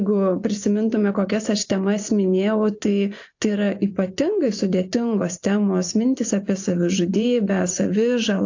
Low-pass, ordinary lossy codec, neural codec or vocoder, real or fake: 7.2 kHz; AAC, 48 kbps; vocoder, 24 kHz, 100 mel bands, Vocos; fake